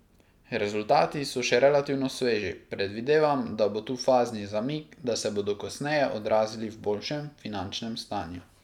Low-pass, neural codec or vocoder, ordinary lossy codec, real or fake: 19.8 kHz; none; none; real